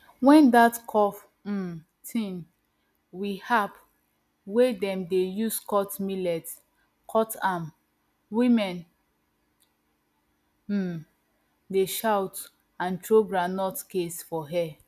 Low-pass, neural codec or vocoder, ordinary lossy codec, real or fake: 14.4 kHz; none; none; real